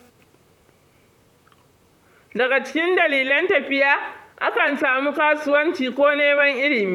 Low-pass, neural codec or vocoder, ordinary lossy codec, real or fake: 19.8 kHz; vocoder, 44.1 kHz, 128 mel bands, Pupu-Vocoder; none; fake